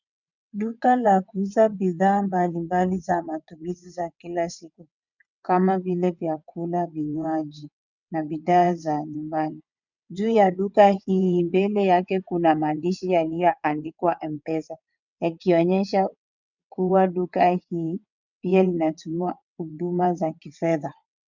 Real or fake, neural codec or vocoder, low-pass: fake; vocoder, 22.05 kHz, 80 mel bands, WaveNeXt; 7.2 kHz